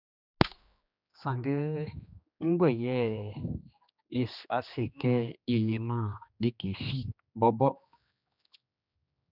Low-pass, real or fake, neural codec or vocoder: 5.4 kHz; fake; codec, 16 kHz, 2 kbps, X-Codec, HuBERT features, trained on general audio